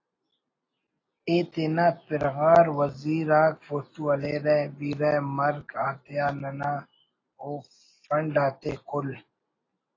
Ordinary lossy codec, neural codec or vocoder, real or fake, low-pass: AAC, 32 kbps; none; real; 7.2 kHz